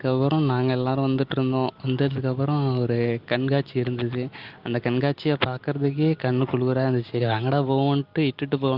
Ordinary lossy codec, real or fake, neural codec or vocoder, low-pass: Opus, 32 kbps; real; none; 5.4 kHz